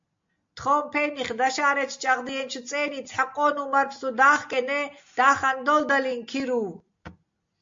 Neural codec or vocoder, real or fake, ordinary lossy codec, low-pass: none; real; MP3, 48 kbps; 7.2 kHz